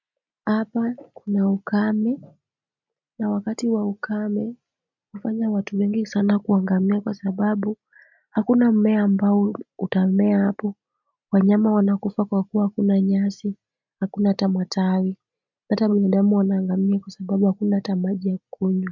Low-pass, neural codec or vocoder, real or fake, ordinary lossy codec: 7.2 kHz; none; real; MP3, 64 kbps